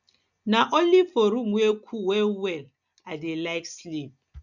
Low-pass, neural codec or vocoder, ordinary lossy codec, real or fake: 7.2 kHz; none; none; real